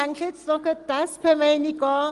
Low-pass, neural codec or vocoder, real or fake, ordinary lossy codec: 10.8 kHz; none; real; Opus, 24 kbps